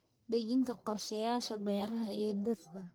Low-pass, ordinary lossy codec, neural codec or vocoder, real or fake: none; none; codec, 44.1 kHz, 1.7 kbps, Pupu-Codec; fake